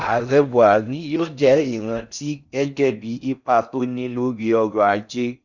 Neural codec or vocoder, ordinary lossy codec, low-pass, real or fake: codec, 16 kHz in and 24 kHz out, 0.6 kbps, FocalCodec, streaming, 4096 codes; none; 7.2 kHz; fake